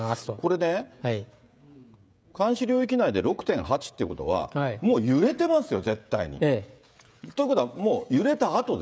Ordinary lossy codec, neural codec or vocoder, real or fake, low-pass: none; codec, 16 kHz, 16 kbps, FreqCodec, smaller model; fake; none